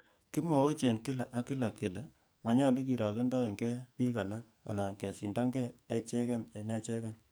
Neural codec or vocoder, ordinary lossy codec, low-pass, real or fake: codec, 44.1 kHz, 2.6 kbps, SNAC; none; none; fake